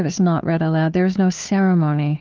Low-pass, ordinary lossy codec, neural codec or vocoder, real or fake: 7.2 kHz; Opus, 24 kbps; codec, 16 kHz, 2 kbps, FunCodec, trained on Chinese and English, 25 frames a second; fake